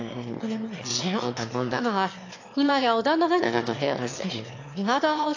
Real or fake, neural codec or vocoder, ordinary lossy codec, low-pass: fake; autoencoder, 22.05 kHz, a latent of 192 numbers a frame, VITS, trained on one speaker; AAC, 48 kbps; 7.2 kHz